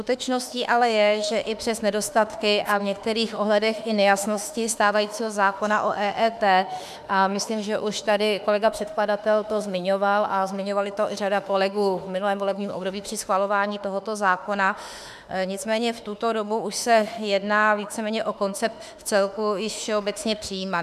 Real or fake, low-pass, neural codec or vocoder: fake; 14.4 kHz; autoencoder, 48 kHz, 32 numbers a frame, DAC-VAE, trained on Japanese speech